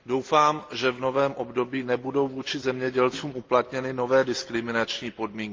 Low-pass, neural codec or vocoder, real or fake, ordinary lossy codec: 7.2 kHz; none; real; Opus, 32 kbps